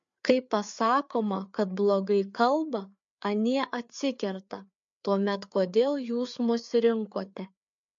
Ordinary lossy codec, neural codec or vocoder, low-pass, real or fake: MP3, 48 kbps; codec, 16 kHz, 4 kbps, FreqCodec, larger model; 7.2 kHz; fake